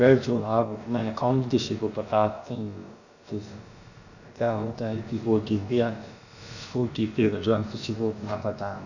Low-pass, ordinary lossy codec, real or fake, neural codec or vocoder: 7.2 kHz; none; fake; codec, 16 kHz, about 1 kbps, DyCAST, with the encoder's durations